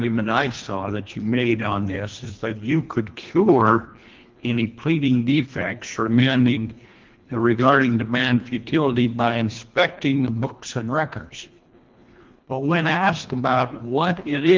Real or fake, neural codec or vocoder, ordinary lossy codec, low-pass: fake; codec, 24 kHz, 1.5 kbps, HILCodec; Opus, 24 kbps; 7.2 kHz